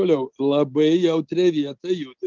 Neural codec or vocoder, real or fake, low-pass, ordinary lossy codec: none; real; 7.2 kHz; Opus, 24 kbps